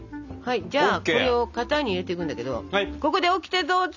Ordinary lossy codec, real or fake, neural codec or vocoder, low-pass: none; real; none; 7.2 kHz